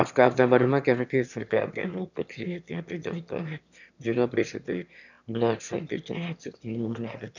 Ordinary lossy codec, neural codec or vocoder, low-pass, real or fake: none; autoencoder, 22.05 kHz, a latent of 192 numbers a frame, VITS, trained on one speaker; 7.2 kHz; fake